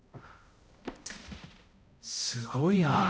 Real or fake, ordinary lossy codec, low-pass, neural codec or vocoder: fake; none; none; codec, 16 kHz, 0.5 kbps, X-Codec, HuBERT features, trained on balanced general audio